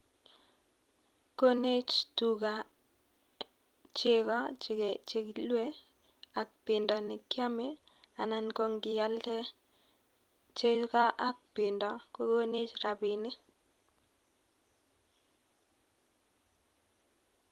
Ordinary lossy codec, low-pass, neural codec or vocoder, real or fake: Opus, 24 kbps; 19.8 kHz; vocoder, 44.1 kHz, 128 mel bands every 256 samples, BigVGAN v2; fake